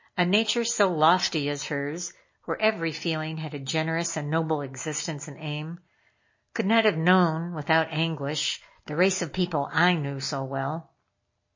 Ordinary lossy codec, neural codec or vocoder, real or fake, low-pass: MP3, 32 kbps; none; real; 7.2 kHz